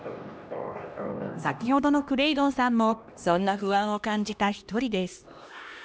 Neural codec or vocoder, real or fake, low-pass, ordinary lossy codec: codec, 16 kHz, 1 kbps, X-Codec, HuBERT features, trained on LibriSpeech; fake; none; none